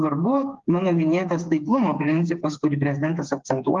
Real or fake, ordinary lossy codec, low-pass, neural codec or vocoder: fake; Opus, 32 kbps; 7.2 kHz; codec, 16 kHz, 4 kbps, FreqCodec, smaller model